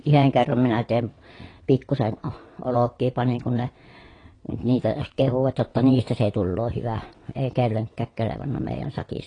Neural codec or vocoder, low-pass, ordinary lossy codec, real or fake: vocoder, 22.05 kHz, 80 mel bands, WaveNeXt; 9.9 kHz; AAC, 32 kbps; fake